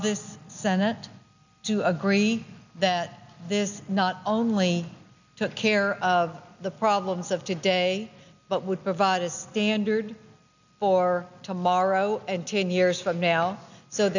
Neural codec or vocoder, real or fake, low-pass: none; real; 7.2 kHz